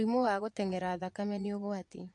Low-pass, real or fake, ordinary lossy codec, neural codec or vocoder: 9.9 kHz; fake; MP3, 48 kbps; vocoder, 22.05 kHz, 80 mel bands, Vocos